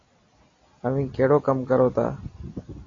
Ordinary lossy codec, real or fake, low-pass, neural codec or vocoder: AAC, 32 kbps; real; 7.2 kHz; none